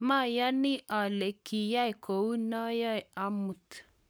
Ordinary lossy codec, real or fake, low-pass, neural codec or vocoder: none; fake; none; codec, 44.1 kHz, 7.8 kbps, Pupu-Codec